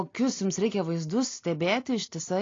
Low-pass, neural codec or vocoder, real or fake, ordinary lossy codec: 7.2 kHz; none; real; AAC, 32 kbps